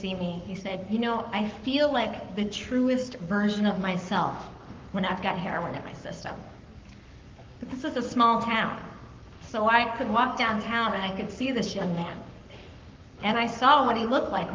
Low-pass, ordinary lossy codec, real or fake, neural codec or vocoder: 7.2 kHz; Opus, 32 kbps; fake; codec, 44.1 kHz, 7.8 kbps, Pupu-Codec